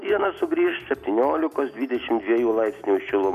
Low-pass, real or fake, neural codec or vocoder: 14.4 kHz; real; none